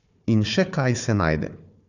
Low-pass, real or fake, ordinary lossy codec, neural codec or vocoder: 7.2 kHz; fake; none; codec, 16 kHz, 4 kbps, FunCodec, trained on Chinese and English, 50 frames a second